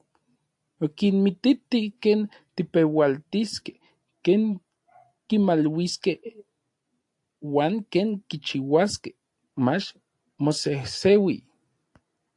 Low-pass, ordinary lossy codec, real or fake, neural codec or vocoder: 10.8 kHz; AAC, 64 kbps; real; none